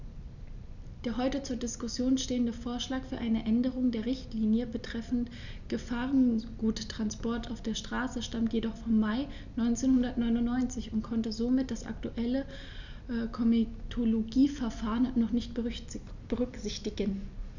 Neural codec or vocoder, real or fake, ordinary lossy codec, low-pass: none; real; none; 7.2 kHz